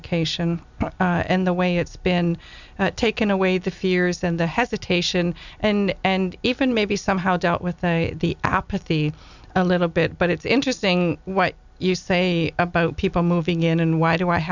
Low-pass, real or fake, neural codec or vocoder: 7.2 kHz; real; none